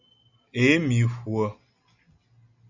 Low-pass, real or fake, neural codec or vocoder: 7.2 kHz; real; none